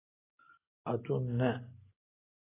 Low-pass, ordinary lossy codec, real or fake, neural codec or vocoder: 3.6 kHz; AAC, 16 kbps; real; none